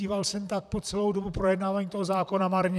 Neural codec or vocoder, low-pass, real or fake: vocoder, 44.1 kHz, 128 mel bands, Pupu-Vocoder; 14.4 kHz; fake